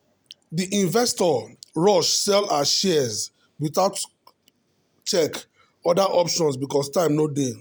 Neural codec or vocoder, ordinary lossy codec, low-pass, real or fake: none; none; none; real